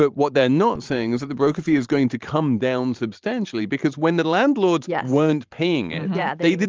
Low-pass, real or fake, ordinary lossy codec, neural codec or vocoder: 7.2 kHz; fake; Opus, 24 kbps; vocoder, 44.1 kHz, 128 mel bands every 512 samples, BigVGAN v2